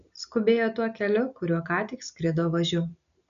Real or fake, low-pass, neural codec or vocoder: real; 7.2 kHz; none